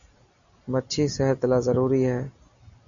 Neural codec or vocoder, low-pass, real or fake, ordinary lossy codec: none; 7.2 kHz; real; MP3, 96 kbps